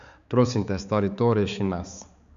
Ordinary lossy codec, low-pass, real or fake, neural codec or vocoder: Opus, 64 kbps; 7.2 kHz; fake; codec, 16 kHz, 4 kbps, X-Codec, HuBERT features, trained on balanced general audio